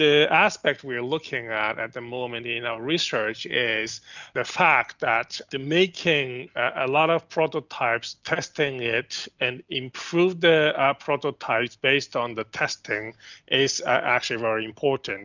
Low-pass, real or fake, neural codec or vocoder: 7.2 kHz; real; none